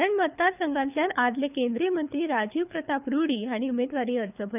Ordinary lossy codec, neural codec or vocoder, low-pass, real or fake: none; codec, 24 kHz, 6 kbps, HILCodec; 3.6 kHz; fake